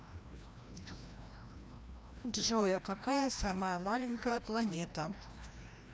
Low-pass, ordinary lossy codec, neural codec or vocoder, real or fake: none; none; codec, 16 kHz, 1 kbps, FreqCodec, larger model; fake